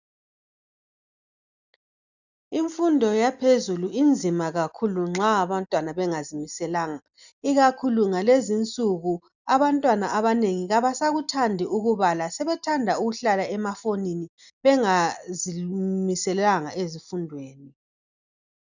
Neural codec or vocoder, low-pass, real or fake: none; 7.2 kHz; real